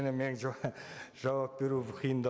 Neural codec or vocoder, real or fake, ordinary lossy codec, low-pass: none; real; none; none